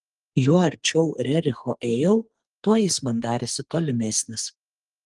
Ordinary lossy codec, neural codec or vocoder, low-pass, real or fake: Opus, 24 kbps; codec, 44.1 kHz, 2.6 kbps, SNAC; 10.8 kHz; fake